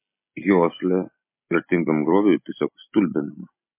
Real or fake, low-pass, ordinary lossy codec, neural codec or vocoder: real; 3.6 kHz; MP3, 24 kbps; none